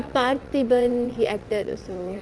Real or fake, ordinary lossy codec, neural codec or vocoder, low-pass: fake; none; vocoder, 22.05 kHz, 80 mel bands, WaveNeXt; none